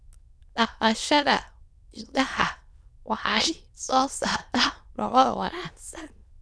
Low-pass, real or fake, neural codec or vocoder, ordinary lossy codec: none; fake; autoencoder, 22.05 kHz, a latent of 192 numbers a frame, VITS, trained on many speakers; none